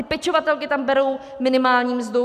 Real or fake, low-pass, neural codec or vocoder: real; 14.4 kHz; none